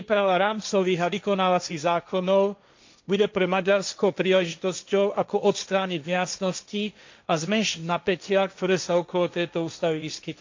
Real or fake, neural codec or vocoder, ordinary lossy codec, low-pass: fake; codec, 16 kHz, 1.1 kbps, Voila-Tokenizer; MP3, 64 kbps; 7.2 kHz